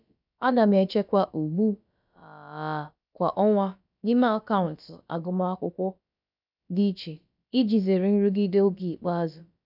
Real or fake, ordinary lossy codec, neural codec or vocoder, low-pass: fake; none; codec, 16 kHz, about 1 kbps, DyCAST, with the encoder's durations; 5.4 kHz